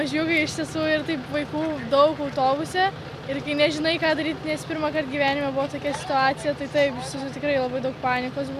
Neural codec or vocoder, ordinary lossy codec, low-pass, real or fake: none; MP3, 96 kbps; 14.4 kHz; real